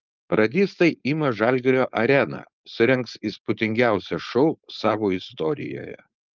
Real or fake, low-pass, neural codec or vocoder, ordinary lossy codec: fake; 7.2 kHz; codec, 16 kHz, 4.8 kbps, FACodec; Opus, 24 kbps